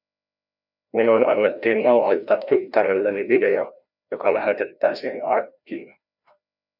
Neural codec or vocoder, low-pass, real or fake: codec, 16 kHz, 1 kbps, FreqCodec, larger model; 5.4 kHz; fake